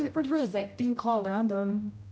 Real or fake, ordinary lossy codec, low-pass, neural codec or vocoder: fake; none; none; codec, 16 kHz, 0.5 kbps, X-Codec, HuBERT features, trained on general audio